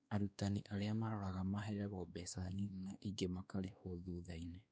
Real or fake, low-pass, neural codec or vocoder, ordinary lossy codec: fake; none; codec, 16 kHz, 2 kbps, X-Codec, WavLM features, trained on Multilingual LibriSpeech; none